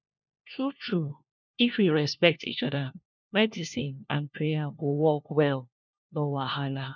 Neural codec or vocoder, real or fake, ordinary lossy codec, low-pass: codec, 16 kHz, 1 kbps, FunCodec, trained on LibriTTS, 50 frames a second; fake; none; 7.2 kHz